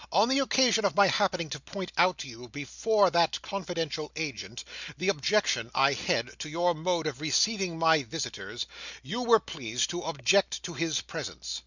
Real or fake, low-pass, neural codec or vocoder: real; 7.2 kHz; none